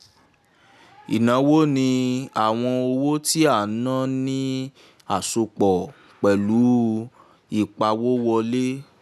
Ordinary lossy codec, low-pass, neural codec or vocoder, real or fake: none; 14.4 kHz; none; real